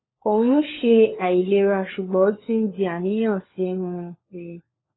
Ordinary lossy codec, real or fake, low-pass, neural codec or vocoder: AAC, 16 kbps; fake; 7.2 kHz; codec, 16 kHz, 4 kbps, FunCodec, trained on LibriTTS, 50 frames a second